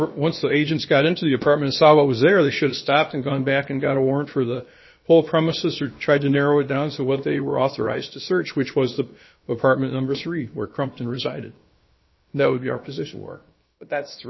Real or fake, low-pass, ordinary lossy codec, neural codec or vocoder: fake; 7.2 kHz; MP3, 24 kbps; codec, 16 kHz, about 1 kbps, DyCAST, with the encoder's durations